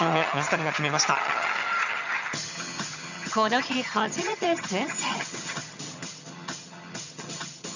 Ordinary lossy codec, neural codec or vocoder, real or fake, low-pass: none; vocoder, 22.05 kHz, 80 mel bands, HiFi-GAN; fake; 7.2 kHz